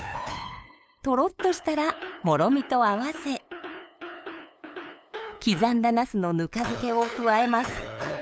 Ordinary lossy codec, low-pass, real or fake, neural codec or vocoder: none; none; fake; codec, 16 kHz, 8 kbps, FunCodec, trained on LibriTTS, 25 frames a second